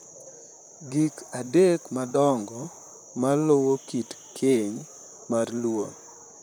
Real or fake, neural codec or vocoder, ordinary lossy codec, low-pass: fake; vocoder, 44.1 kHz, 128 mel bands, Pupu-Vocoder; none; none